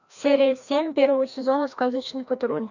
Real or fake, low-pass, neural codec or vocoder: fake; 7.2 kHz; codec, 16 kHz, 1 kbps, FreqCodec, larger model